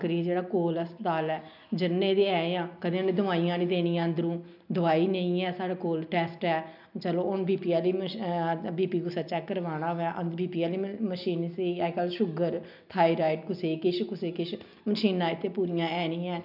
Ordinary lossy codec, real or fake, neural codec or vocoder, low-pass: none; real; none; 5.4 kHz